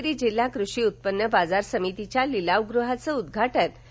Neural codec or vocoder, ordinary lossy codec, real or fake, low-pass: none; none; real; none